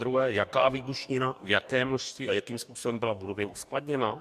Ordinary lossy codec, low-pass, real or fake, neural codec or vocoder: MP3, 96 kbps; 14.4 kHz; fake; codec, 44.1 kHz, 2.6 kbps, DAC